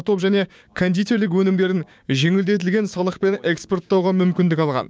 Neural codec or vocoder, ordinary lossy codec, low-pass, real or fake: codec, 16 kHz, 6 kbps, DAC; none; none; fake